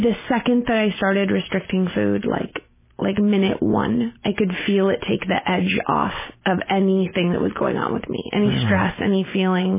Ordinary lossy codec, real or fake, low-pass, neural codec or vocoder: MP3, 16 kbps; real; 3.6 kHz; none